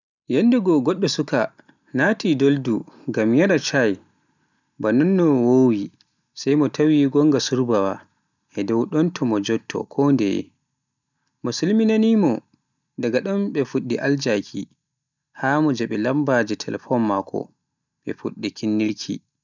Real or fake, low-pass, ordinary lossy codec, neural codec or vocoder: real; 7.2 kHz; none; none